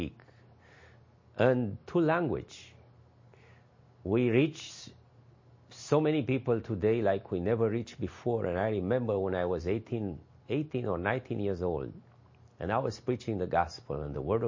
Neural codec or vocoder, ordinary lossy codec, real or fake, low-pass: none; MP3, 32 kbps; real; 7.2 kHz